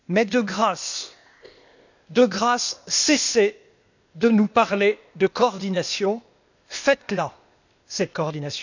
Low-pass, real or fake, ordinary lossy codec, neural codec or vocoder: 7.2 kHz; fake; none; codec, 16 kHz, 0.8 kbps, ZipCodec